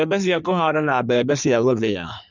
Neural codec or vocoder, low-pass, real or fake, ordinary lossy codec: codec, 16 kHz in and 24 kHz out, 1.1 kbps, FireRedTTS-2 codec; 7.2 kHz; fake; none